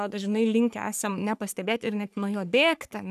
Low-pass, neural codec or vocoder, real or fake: 14.4 kHz; codec, 44.1 kHz, 3.4 kbps, Pupu-Codec; fake